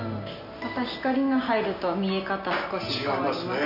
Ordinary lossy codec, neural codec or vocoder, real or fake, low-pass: none; none; real; 5.4 kHz